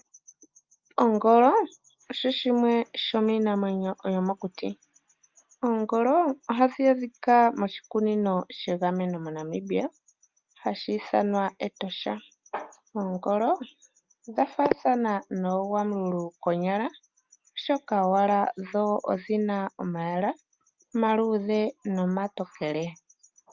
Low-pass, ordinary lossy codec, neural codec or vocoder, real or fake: 7.2 kHz; Opus, 32 kbps; none; real